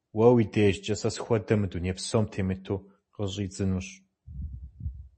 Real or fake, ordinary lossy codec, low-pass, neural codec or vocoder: real; MP3, 32 kbps; 10.8 kHz; none